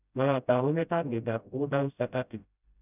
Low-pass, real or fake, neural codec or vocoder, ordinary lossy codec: 3.6 kHz; fake; codec, 16 kHz, 0.5 kbps, FreqCodec, smaller model; none